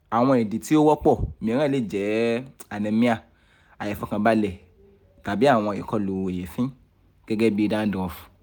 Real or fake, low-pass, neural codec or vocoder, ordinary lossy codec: real; none; none; none